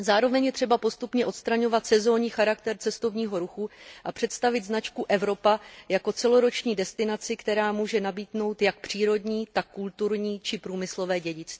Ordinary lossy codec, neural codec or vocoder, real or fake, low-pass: none; none; real; none